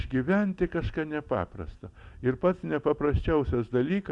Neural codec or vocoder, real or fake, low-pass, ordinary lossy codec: none; real; 10.8 kHz; Opus, 64 kbps